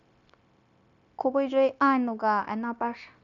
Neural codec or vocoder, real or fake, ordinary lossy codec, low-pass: codec, 16 kHz, 0.9 kbps, LongCat-Audio-Codec; fake; none; 7.2 kHz